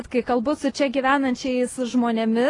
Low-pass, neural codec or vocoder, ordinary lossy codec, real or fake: 10.8 kHz; none; AAC, 32 kbps; real